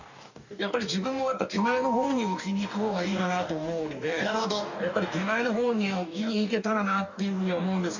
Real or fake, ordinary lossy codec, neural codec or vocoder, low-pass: fake; none; codec, 44.1 kHz, 2.6 kbps, DAC; 7.2 kHz